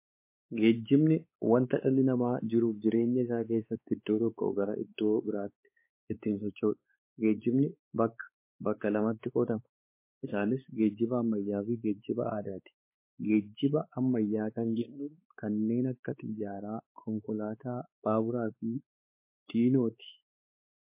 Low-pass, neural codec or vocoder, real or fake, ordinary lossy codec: 3.6 kHz; codec, 16 kHz, 4 kbps, X-Codec, WavLM features, trained on Multilingual LibriSpeech; fake; MP3, 24 kbps